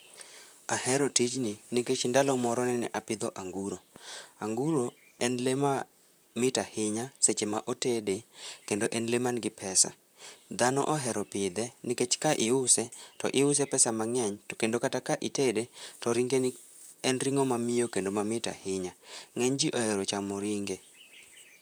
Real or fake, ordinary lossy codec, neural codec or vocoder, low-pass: fake; none; vocoder, 44.1 kHz, 128 mel bands, Pupu-Vocoder; none